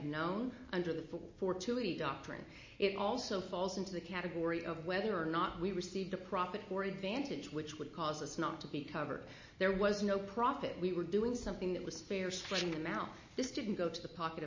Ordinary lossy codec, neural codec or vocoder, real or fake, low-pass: MP3, 32 kbps; none; real; 7.2 kHz